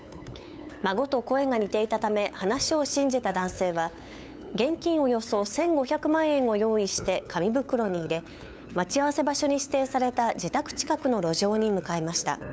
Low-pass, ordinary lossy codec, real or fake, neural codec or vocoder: none; none; fake; codec, 16 kHz, 8 kbps, FunCodec, trained on LibriTTS, 25 frames a second